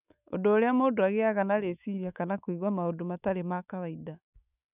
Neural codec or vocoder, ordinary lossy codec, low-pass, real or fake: autoencoder, 48 kHz, 128 numbers a frame, DAC-VAE, trained on Japanese speech; none; 3.6 kHz; fake